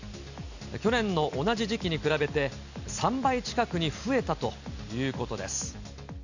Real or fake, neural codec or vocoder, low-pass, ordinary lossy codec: real; none; 7.2 kHz; none